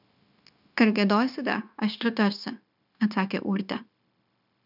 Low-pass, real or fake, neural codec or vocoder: 5.4 kHz; fake; codec, 16 kHz, 0.9 kbps, LongCat-Audio-Codec